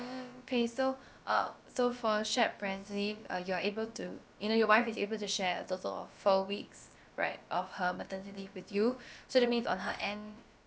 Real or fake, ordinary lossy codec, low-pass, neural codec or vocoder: fake; none; none; codec, 16 kHz, about 1 kbps, DyCAST, with the encoder's durations